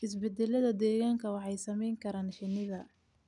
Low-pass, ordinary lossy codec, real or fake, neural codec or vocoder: 10.8 kHz; none; real; none